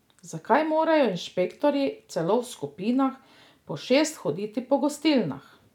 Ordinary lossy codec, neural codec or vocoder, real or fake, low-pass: none; none; real; 19.8 kHz